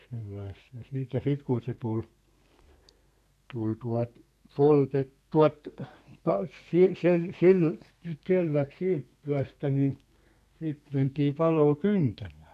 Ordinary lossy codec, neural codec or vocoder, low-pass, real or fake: none; codec, 32 kHz, 1.9 kbps, SNAC; 14.4 kHz; fake